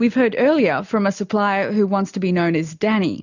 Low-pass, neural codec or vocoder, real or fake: 7.2 kHz; none; real